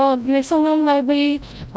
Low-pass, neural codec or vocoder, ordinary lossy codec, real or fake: none; codec, 16 kHz, 0.5 kbps, FreqCodec, larger model; none; fake